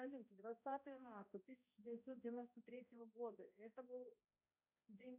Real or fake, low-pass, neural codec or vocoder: fake; 3.6 kHz; codec, 16 kHz, 0.5 kbps, X-Codec, HuBERT features, trained on balanced general audio